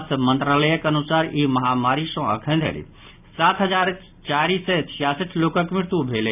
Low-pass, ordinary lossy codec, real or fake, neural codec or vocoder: 3.6 kHz; none; real; none